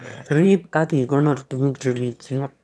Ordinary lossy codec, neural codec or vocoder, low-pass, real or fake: none; autoencoder, 22.05 kHz, a latent of 192 numbers a frame, VITS, trained on one speaker; none; fake